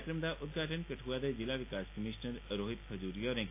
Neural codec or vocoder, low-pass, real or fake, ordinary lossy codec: none; 3.6 kHz; real; AAC, 24 kbps